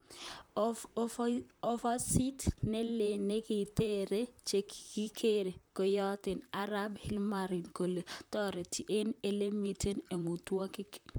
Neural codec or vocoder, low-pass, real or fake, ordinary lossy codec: vocoder, 44.1 kHz, 128 mel bands, Pupu-Vocoder; none; fake; none